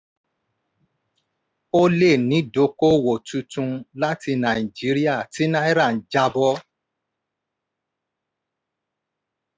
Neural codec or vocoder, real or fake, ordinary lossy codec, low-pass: none; real; none; none